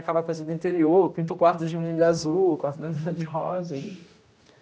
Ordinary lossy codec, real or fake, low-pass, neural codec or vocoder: none; fake; none; codec, 16 kHz, 1 kbps, X-Codec, HuBERT features, trained on general audio